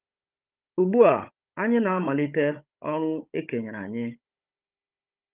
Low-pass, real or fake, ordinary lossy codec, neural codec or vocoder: 3.6 kHz; fake; Opus, 32 kbps; codec, 16 kHz, 16 kbps, FunCodec, trained on Chinese and English, 50 frames a second